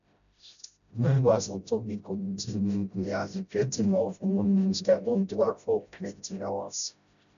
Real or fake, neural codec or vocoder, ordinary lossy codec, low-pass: fake; codec, 16 kHz, 0.5 kbps, FreqCodec, smaller model; none; 7.2 kHz